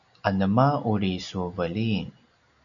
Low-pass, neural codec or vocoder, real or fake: 7.2 kHz; none; real